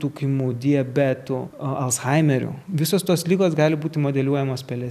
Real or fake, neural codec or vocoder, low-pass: real; none; 14.4 kHz